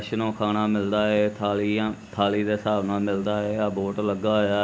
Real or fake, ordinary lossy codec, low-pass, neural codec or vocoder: real; none; none; none